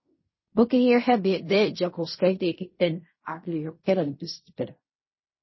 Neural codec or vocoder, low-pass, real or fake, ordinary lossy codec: codec, 16 kHz in and 24 kHz out, 0.4 kbps, LongCat-Audio-Codec, fine tuned four codebook decoder; 7.2 kHz; fake; MP3, 24 kbps